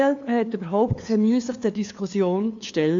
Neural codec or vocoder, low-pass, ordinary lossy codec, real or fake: codec, 16 kHz, 2 kbps, FunCodec, trained on LibriTTS, 25 frames a second; 7.2 kHz; AAC, 48 kbps; fake